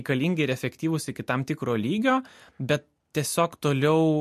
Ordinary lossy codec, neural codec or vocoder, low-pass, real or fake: MP3, 64 kbps; none; 14.4 kHz; real